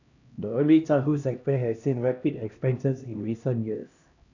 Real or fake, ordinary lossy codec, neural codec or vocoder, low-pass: fake; none; codec, 16 kHz, 1 kbps, X-Codec, HuBERT features, trained on LibriSpeech; 7.2 kHz